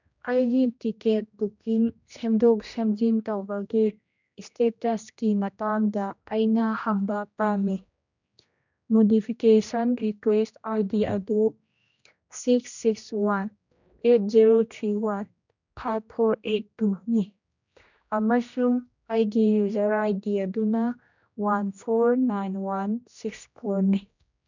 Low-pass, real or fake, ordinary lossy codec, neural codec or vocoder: 7.2 kHz; fake; none; codec, 16 kHz, 1 kbps, X-Codec, HuBERT features, trained on general audio